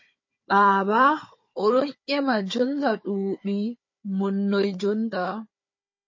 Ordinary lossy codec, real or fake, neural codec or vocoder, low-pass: MP3, 32 kbps; fake; codec, 16 kHz, 4 kbps, FunCodec, trained on Chinese and English, 50 frames a second; 7.2 kHz